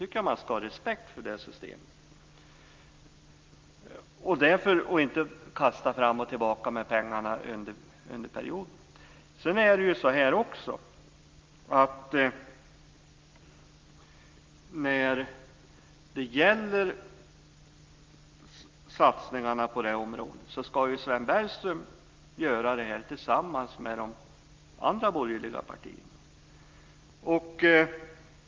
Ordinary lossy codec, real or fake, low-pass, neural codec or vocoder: Opus, 32 kbps; real; 7.2 kHz; none